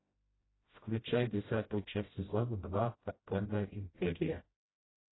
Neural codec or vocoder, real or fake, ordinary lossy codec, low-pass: codec, 16 kHz, 0.5 kbps, FreqCodec, smaller model; fake; AAC, 16 kbps; 7.2 kHz